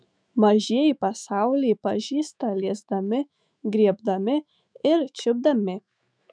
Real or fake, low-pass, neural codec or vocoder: fake; 9.9 kHz; autoencoder, 48 kHz, 128 numbers a frame, DAC-VAE, trained on Japanese speech